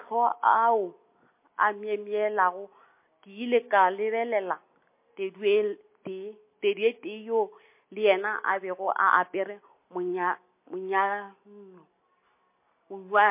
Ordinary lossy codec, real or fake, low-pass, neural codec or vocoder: MP3, 24 kbps; real; 3.6 kHz; none